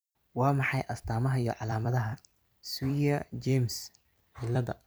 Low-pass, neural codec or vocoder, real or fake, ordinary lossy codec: none; vocoder, 44.1 kHz, 128 mel bands every 256 samples, BigVGAN v2; fake; none